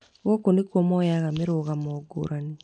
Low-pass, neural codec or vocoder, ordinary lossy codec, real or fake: 9.9 kHz; none; none; real